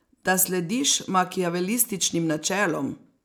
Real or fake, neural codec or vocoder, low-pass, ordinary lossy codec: real; none; none; none